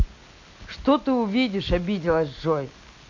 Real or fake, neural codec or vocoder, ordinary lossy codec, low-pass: real; none; MP3, 48 kbps; 7.2 kHz